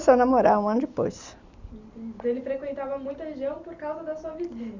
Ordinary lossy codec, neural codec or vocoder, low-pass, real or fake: Opus, 64 kbps; none; 7.2 kHz; real